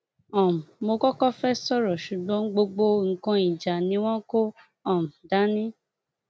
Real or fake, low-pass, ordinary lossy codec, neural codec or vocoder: real; none; none; none